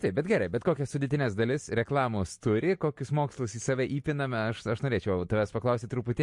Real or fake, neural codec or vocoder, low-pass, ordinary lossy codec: real; none; 10.8 kHz; MP3, 48 kbps